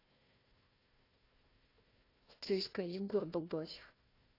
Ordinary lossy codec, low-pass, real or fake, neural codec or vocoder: AAC, 24 kbps; 5.4 kHz; fake; codec, 16 kHz, 1 kbps, FunCodec, trained on Chinese and English, 50 frames a second